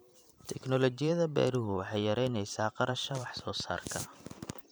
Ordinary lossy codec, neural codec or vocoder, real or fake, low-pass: none; vocoder, 44.1 kHz, 128 mel bands every 512 samples, BigVGAN v2; fake; none